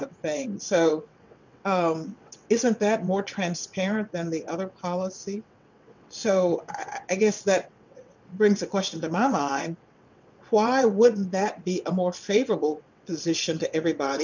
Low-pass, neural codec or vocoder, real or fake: 7.2 kHz; vocoder, 22.05 kHz, 80 mel bands, WaveNeXt; fake